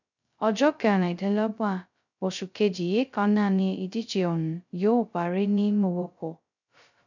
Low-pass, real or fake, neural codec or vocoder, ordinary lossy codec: 7.2 kHz; fake; codec, 16 kHz, 0.2 kbps, FocalCodec; none